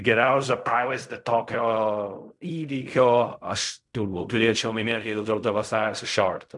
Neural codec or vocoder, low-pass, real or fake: codec, 16 kHz in and 24 kHz out, 0.4 kbps, LongCat-Audio-Codec, fine tuned four codebook decoder; 10.8 kHz; fake